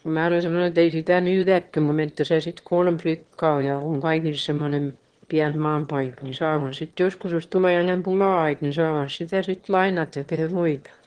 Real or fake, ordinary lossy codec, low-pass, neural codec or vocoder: fake; Opus, 16 kbps; 9.9 kHz; autoencoder, 22.05 kHz, a latent of 192 numbers a frame, VITS, trained on one speaker